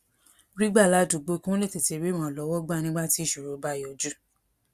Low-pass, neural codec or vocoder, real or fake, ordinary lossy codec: 14.4 kHz; none; real; Opus, 64 kbps